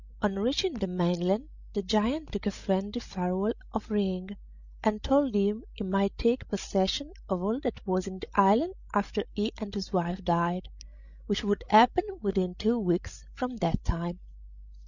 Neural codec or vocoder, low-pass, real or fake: codec, 16 kHz, 16 kbps, FreqCodec, larger model; 7.2 kHz; fake